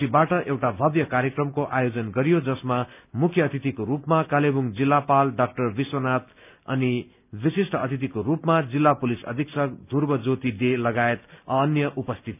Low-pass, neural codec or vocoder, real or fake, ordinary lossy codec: 3.6 kHz; none; real; none